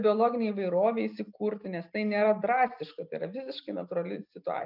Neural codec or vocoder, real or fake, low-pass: none; real; 5.4 kHz